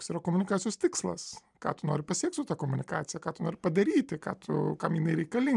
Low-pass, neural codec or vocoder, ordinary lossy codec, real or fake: 10.8 kHz; none; MP3, 96 kbps; real